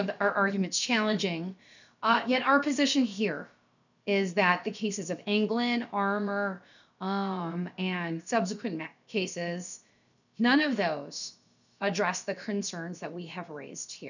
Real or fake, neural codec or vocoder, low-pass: fake; codec, 16 kHz, about 1 kbps, DyCAST, with the encoder's durations; 7.2 kHz